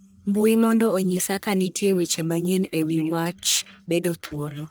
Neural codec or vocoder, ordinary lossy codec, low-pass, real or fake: codec, 44.1 kHz, 1.7 kbps, Pupu-Codec; none; none; fake